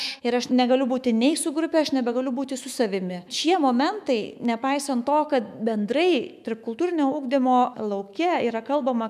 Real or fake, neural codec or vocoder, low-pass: fake; autoencoder, 48 kHz, 128 numbers a frame, DAC-VAE, trained on Japanese speech; 14.4 kHz